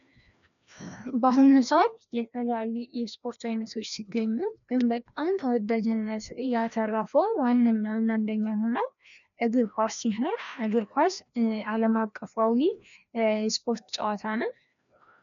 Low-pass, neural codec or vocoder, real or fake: 7.2 kHz; codec, 16 kHz, 1 kbps, FreqCodec, larger model; fake